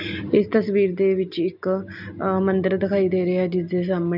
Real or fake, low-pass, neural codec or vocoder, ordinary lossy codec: real; 5.4 kHz; none; none